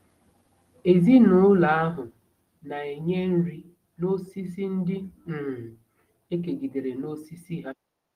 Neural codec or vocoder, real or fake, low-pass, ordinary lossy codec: none; real; 14.4 kHz; Opus, 24 kbps